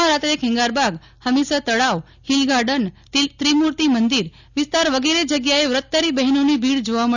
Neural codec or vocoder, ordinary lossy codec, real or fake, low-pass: none; none; real; 7.2 kHz